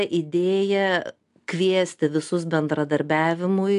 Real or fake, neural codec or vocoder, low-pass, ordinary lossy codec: real; none; 10.8 kHz; AAC, 64 kbps